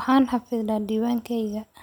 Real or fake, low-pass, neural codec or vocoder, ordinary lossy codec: real; 19.8 kHz; none; none